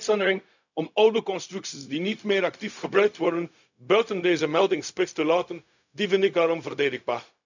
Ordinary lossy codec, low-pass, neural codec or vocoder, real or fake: none; 7.2 kHz; codec, 16 kHz, 0.4 kbps, LongCat-Audio-Codec; fake